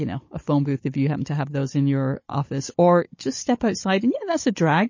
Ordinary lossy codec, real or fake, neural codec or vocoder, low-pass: MP3, 32 kbps; fake; codec, 16 kHz, 16 kbps, FunCodec, trained on Chinese and English, 50 frames a second; 7.2 kHz